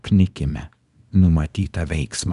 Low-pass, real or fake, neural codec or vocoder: 10.8 kHz; fake; codec, 24 kHz, 0.9 kbps, WavTokenizer, small release